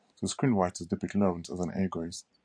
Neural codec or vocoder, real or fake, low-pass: vocoder, 44.1 kHz, 128 mel bands every 512 samples, BigVGAN v2; fake; 9.9 kHz